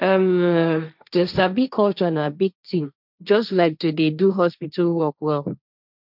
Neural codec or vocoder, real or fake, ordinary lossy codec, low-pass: codec, 16 kHz, 1.1 kbps, Voila-Tokenizer; fake; none; 5.4 kHz